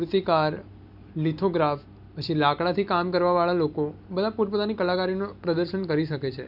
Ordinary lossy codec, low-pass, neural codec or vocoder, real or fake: none; 5.4 kHz; none; real